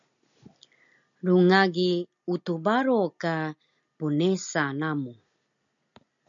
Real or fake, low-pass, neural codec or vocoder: real; 7.2 kHz; none